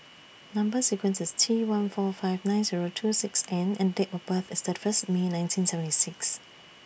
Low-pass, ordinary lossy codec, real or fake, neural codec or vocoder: none; none; real; none